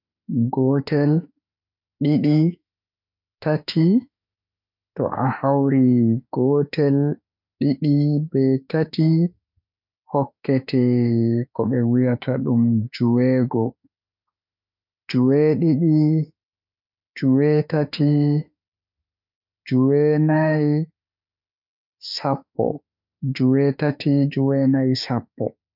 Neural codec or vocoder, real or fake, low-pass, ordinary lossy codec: autoencoder, 48 kHz, 32 numbers a frame, DAC-VAE, trained on Japanese speech; fake; 5.4 kHz; none